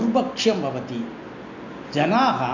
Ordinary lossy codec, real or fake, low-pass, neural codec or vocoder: none; real; 7.2 kHz; none